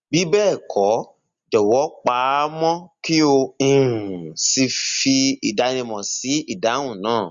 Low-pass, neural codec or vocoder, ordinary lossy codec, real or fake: 7.2 kHz; none; Opus, 64 kbps; real